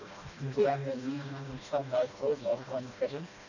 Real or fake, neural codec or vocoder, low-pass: fake; codec, 16 kHz, 1 kbps, FreqCodec, smaller model; 7.2 kHz